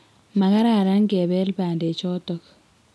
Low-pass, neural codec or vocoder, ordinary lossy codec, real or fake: none; none; none; real